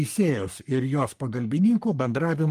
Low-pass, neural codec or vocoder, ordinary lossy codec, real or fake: 14.4 kHz; codec, 44.1 kHz, 3.4 kbps, Pupu-Codec; Opus, 24 kbps; fake